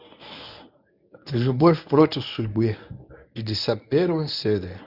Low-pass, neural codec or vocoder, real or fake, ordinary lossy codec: 5.4 kHz; codec, 24 kHz, 0.9 kbps, WavTokenizer, medium speech release version 1; fake; none